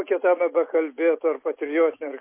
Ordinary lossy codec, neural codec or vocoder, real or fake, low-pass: MP3, 24 kbps; none; real; 3.6 kHz